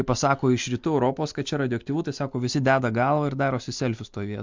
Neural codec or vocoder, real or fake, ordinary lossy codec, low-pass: none; real; MP3, 64 kbps; 7.2 kHz